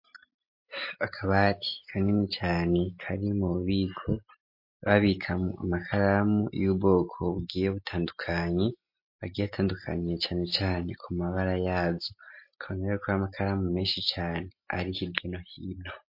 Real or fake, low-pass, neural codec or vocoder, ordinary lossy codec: real; 5.4 kHz; none; MP3, 32 kbps